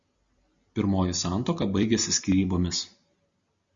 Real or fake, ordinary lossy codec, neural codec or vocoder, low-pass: real; Opus, 64 kbps; none; 7.2 kHz